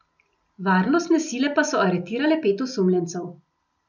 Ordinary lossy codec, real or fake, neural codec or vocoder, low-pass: none; real; none; 7.2 kHz